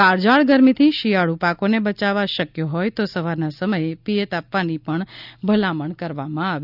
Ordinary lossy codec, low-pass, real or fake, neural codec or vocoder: none; 5.4 kHz; real; none